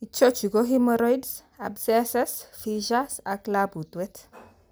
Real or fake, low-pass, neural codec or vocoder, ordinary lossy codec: real; none; none; none